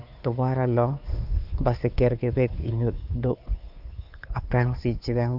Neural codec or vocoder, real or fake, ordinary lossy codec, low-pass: codec, 16 kHz, 2 kbps, FunCodec, trained on Chinese and English, 25 frames a second; fake; none; 5.4 kHz